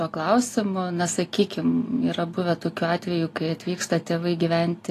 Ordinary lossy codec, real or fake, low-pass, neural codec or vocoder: AAC, 48 kbps; real; 14.4 kHz; none